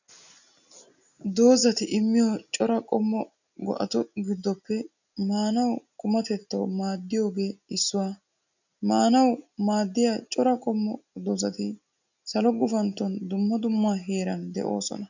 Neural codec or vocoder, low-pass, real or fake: none; 7.2 kHz; real